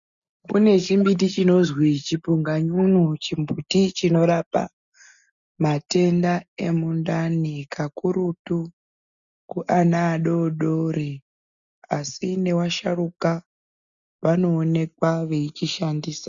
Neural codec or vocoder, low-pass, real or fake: none; 7.2 kHz; real